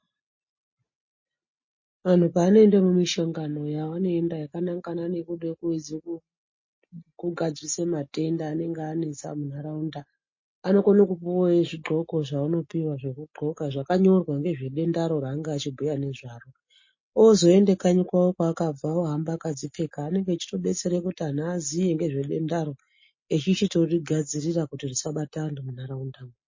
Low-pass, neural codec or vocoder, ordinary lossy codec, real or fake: 7.2 kHz; none; MP3, 32 kbps; real